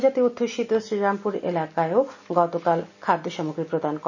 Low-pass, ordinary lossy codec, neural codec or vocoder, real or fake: 7.2 kHz; none; none; real